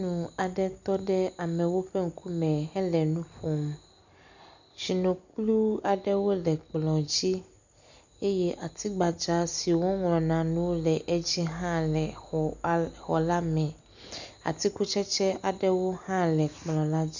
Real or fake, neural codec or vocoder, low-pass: real; none; 7.2 kHz